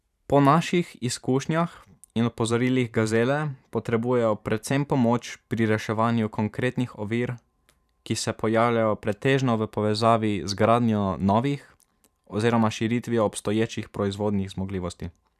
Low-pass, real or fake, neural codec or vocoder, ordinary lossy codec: 14.4 kHz; fake; vocoder, 44.1 kHz, 128 mel bands every 512 samples, BigVGAN v2; none